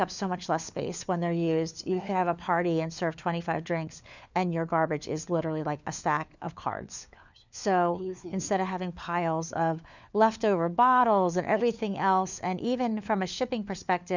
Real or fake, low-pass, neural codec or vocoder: fake; 7.2 kHz; codec, 16 kHz, 4 kbps, FunCodec, trained on LibriTTS, 50 frames a second